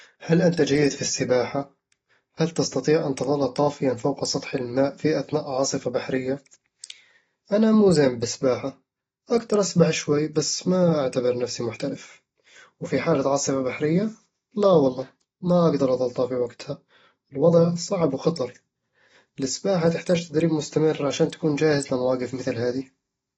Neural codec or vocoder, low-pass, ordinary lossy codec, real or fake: none; 14.4 kHz; AAC, 24 kbps; real